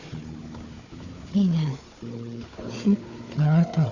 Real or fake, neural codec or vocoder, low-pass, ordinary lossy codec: fake; codec, 16 kHz, 4 kbps, FunCodec, trained on Chinese and English, 50 frames a second; 7.2 kHz; none